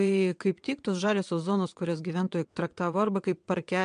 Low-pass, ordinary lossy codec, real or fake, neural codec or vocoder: 9.9 kHz; MP3, 64 kbps; fake; vocoder, 22.05 kHz, 80 mel bands, WaveNeXt